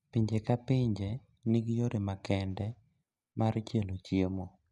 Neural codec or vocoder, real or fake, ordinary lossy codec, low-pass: none; real; none; 10.8 kHz